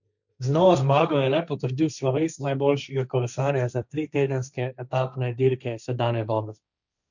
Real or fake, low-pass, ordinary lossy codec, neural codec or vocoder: fake; 7.2 kHz; none; codec, 16 kHz, 1.1 kbps, Voila-Tokenizer